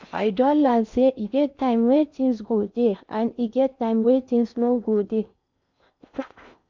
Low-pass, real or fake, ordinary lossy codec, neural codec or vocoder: 7.2 kHz; fake; MP3, 64 kbps; codec, 16 kHz in and 24 kHz out, 0.8 kbps, FocalCodec, streaming, 65536 codes